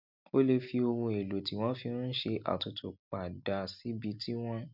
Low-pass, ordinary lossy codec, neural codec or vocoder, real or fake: 5.4 kHz; none; none; real